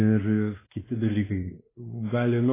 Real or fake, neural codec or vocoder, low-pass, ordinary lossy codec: fake; codec, 16 kHz, 2 kbps, X-Codec, WavLM features, trained on Multilingual LibriSpeech; 3.6 kHz; AAC, 16 kbps